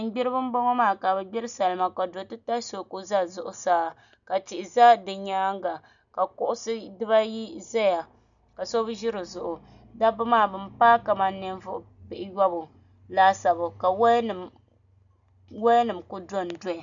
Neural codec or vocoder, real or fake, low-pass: none; real; 7.2 kHz